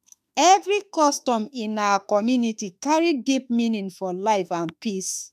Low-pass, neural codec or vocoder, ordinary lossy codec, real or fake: 14.4 kHz; autoencoder, 48 kHz, 32 numbers a frame, DAC-VAE, trained on Japanese speech; none; fake